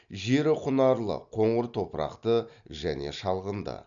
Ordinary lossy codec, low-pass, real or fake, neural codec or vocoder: none; 7.2 kHz; real; none